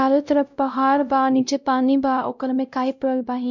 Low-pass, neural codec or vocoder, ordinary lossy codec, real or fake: 7.2 kHz; codec, 16 kHz, 0.5 kbps, X-Codec, WavLM features, trained on Multilingual LibriSpeech; none; fake